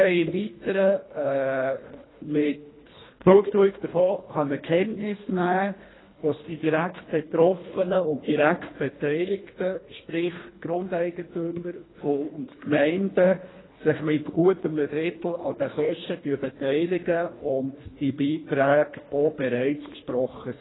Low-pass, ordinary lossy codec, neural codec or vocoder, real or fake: 7.2 kHz; AAC, 16 kbps; codec, 24 kHz, 1.5 kbps, HILCodec; fake